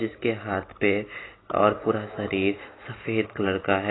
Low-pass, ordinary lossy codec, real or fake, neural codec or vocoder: 7.2 kHz; AAC, 16 kbps; real; none